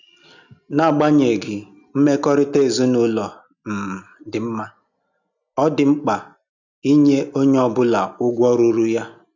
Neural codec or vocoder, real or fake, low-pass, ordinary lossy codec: none; real; 7.2 kHz; none